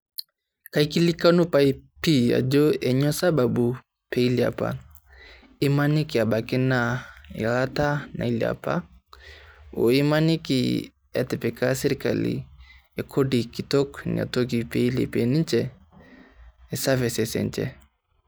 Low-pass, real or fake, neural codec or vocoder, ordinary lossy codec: none; real; none; none